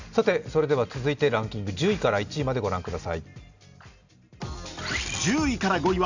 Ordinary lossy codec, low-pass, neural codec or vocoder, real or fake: none; 7.2 kHz; none; real